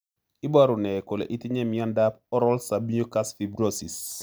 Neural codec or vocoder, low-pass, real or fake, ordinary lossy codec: none; none; real; none